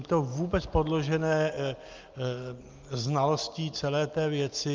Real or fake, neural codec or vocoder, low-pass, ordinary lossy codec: real; none; 7.2 kHz; Opus, 24 kbps